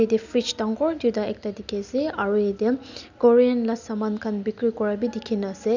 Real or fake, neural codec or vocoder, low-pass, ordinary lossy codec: real; none; 7.2 kHz; none